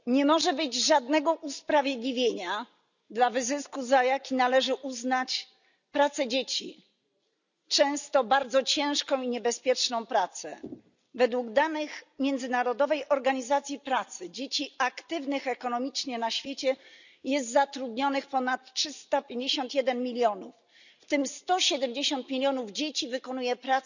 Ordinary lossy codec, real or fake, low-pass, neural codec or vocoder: none; real; 7.2 kHz; none